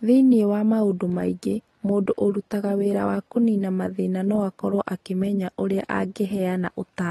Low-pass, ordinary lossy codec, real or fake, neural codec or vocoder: 19.8 kHz; AAC, 32 kbps; real; none